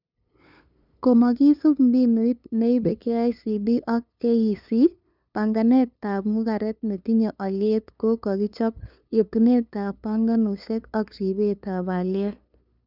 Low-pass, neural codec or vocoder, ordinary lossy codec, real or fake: 5.4 kHz; codec, 16 kHz, 2 kbps, FunCodec, trained on LibriTTS, 25 frames a second; none; fake